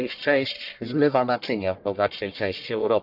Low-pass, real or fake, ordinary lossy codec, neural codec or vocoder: 5.4 kHz; fake; none; codec, 44.1 kHz, 1.7 kbps, Pupu-Codec